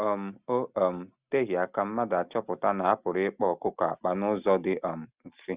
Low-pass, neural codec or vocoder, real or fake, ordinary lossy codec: 3.6 kHz; none; real; Opus, 64 kbps